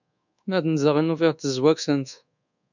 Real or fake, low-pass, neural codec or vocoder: fake; 7.2 kHz; codec, 24 kHz, 1.2 kbps, DualCodec